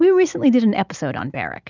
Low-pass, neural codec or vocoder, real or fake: 7.2 kHz; none; real